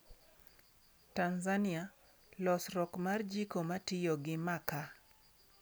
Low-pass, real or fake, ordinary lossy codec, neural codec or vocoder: none; real; none; none